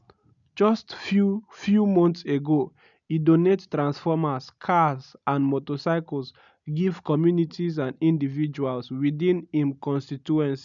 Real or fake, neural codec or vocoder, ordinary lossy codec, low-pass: real; none; none; 7.2 kHz